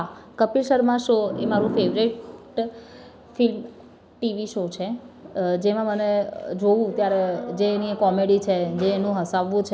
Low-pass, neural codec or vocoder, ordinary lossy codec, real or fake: none; none; none; real